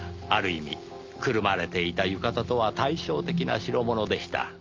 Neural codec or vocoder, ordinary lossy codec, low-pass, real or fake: none; Opus, 24 kbps; 7.2 kHz; real